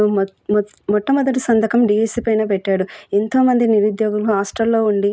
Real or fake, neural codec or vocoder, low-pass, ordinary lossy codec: real; none; none; none